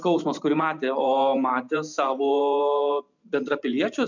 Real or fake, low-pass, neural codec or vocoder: fake; 7.2 kHz; vocoder, 44.1 kHz, 128 mel bands every 512 samples, BigVGAN v2